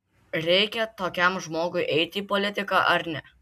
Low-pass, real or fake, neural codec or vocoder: 14.4 kHz; real; none